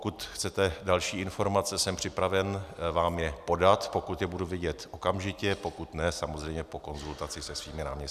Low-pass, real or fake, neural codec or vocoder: 14.4 kHz; real; none